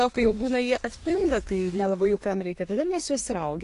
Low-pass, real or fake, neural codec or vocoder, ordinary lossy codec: 10.8 kHz; fake; codec, 24 kHz, 1 kbps, SNAC; AAC, 48 kbps